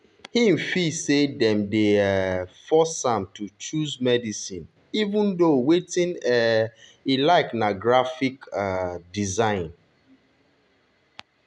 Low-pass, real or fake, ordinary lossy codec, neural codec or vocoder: 10.8 kHz; real; none; none